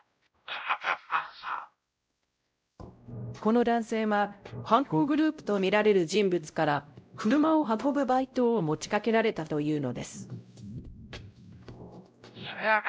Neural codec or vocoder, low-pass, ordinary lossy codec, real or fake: codec, 16 kHz, 0.5 kbps, X-Codec, WavLM features, trained on Multilingual LibriSpeech; none; none; fake